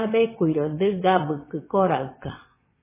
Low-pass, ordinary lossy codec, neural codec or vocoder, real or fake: 3.6 kHz; MP3, 16 kbps; vocoder, 22.05 kHz, 80 mel bands, WaveNeXt; fake